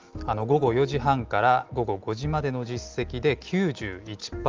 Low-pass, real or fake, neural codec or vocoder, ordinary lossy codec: 7.2 kHz; real; none; Opus, 24 kbps